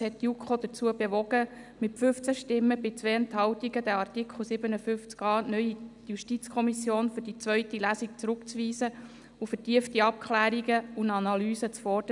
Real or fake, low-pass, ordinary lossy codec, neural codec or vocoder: real; 10.8 kHz; none; none